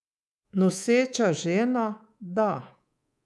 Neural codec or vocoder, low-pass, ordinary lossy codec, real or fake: codec, 24 kHz, 3.1 kbps, DualCodec; none; none; fake